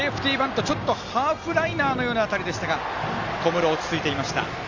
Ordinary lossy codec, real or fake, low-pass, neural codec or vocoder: Opus, 32 kbps; real; 7.2 kHz; none